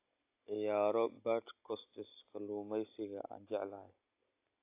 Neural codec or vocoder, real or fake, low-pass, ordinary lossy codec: none; real; 3.6 kHz; AAC, 24 kbps